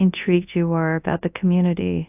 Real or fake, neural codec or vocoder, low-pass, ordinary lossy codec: fake; codec, 24 kHz, 0.9 kbps, WavTokenizer, large speech release; 3.6 kHz; AAC, 32 kbps